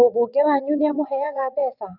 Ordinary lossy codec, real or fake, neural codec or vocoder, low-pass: none; fake; vocoder, 22.05 kHz, 80 mel bands, WaveNeXt; 5.4 kHz